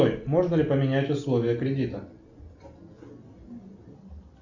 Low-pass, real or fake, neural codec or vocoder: 7.2 kHz; real; none